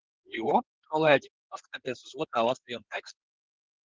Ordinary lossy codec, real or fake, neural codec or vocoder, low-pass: Opus, 16 kbps; fake; codec, 16 kHz in and 24 kHz out, 2.2 kbps, FireRedTTS-2 codec; 7.2 kHz